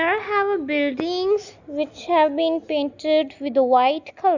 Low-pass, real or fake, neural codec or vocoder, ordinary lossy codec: 7.2 kHz; real; none; none